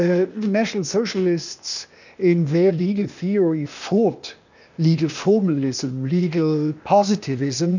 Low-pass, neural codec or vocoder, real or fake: 7.2 kHz; codec, 16 kHz, 0.8 kbps, ZipCodec; fake